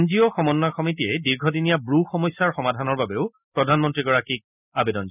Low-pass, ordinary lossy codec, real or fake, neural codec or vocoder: 3.6 kHz; none; real; none